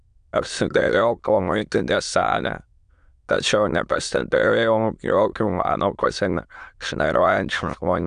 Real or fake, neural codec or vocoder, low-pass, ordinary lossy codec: fake; autoencoder, 22.05 kHz, a latent of 192 numbers a frame, VITS, trained on many speakers; 9.9 kHz; AAC, 96 kbps